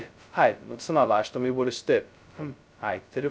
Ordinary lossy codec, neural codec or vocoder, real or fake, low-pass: none; codec, 16 kHz, 0.2 kbps, FocalCodec; fake; none